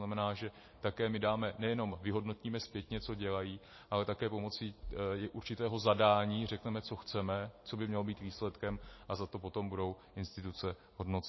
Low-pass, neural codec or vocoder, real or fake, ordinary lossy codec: 7.2 kHz; none; real; MP3, 24 kbps